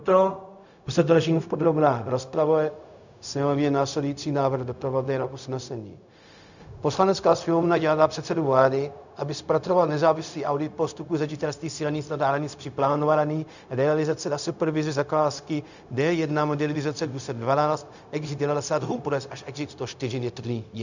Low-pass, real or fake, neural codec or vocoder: 7.2 kHz; fake; codec, 16 kHz, 0.4 kbps, LongCat-Audio-Codec